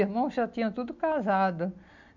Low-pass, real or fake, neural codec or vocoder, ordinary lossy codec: 7.2 kHz; real; none; none